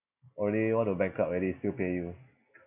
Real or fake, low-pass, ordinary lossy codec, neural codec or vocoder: real; 3.6 kHz; none; none